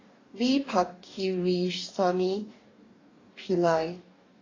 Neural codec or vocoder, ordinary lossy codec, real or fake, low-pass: codec, 44.1 kHz, 2.6 kbps, DAC; AAC, 32 kbps; fake; 7.2 kHz